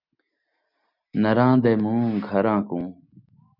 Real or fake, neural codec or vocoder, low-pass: real; none; 5.4 kHz